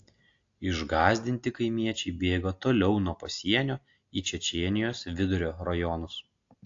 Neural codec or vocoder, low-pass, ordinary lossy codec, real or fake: none; 7.2 kHz; AAC, 48 kbps; real